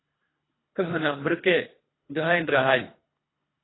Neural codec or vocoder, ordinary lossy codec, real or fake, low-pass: codec, 24 kHz, 3 kbps, HILCodec; AAC, 16 kbps; fake; 7.2 kHz